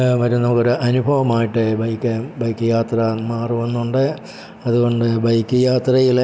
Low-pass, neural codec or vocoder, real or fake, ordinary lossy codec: none; none; real; none